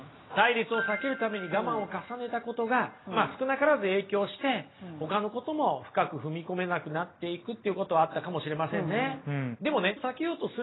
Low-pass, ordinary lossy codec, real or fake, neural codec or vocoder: 7.2 kHz; AAC, 16 kbps; real; none